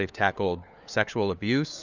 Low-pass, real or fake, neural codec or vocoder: 7.2 kHz; fake; codec, 16 kHz, 4 kbps, FunCodec, trained on LibriTTS, 50 frames a second